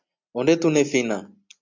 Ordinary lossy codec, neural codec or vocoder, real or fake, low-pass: MP3, 64 kbps; none; real; 7.2 kHz